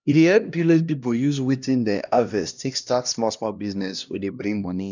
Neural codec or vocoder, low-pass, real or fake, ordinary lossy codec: codec, 16 kHz, 1 kbps, X-Codec, HuBERT features, trained on LibriSpeech; 7.2 kHz; fake; none